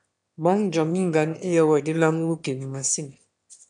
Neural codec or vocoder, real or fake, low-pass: autoencoder, 22.05 kHz, a latent of 192 numbers a frame, VITS, trained on one speaker; fake; 9.9 kHz